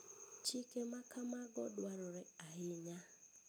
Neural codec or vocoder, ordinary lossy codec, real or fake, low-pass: none; none; real; none